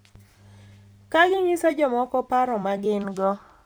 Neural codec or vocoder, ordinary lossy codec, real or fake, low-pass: vocoder, 44.1 kHz, 128 mel bands every 256 samples, BigVGAN v2; none; fake; none